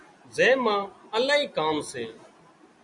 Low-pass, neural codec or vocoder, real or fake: 10.8 kHz; none; real